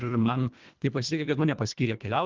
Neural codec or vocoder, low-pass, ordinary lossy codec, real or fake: codec, 24 kHz, 1.5 kbps, HILCodec; 7.2 kHz; Opus, 32 kbps; fake